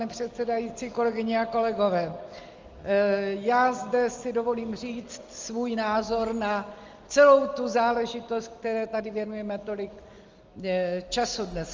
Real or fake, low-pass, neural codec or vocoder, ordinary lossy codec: real; 7.2 kHz; none; Opus, 32 kbps